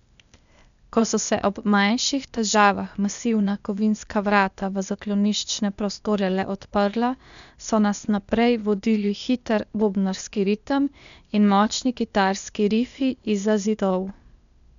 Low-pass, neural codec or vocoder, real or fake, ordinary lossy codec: 7.2 kHz; codec, 16 kHz, 0.8 kbps, ZipCodec; fake; none